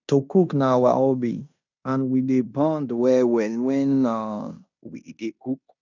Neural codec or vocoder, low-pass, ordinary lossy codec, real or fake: codec, 16 kHz in and 24 kHz out, 0.9 kbps, LongCat-Audio-Codec, fine tuned four codebook decoder; 7.2 kHz; none; fake